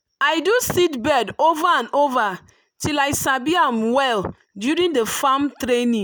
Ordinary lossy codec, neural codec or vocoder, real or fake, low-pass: none; none; real; none